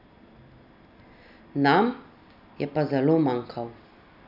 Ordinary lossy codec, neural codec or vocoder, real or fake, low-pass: none; none; real; 5.4 kHz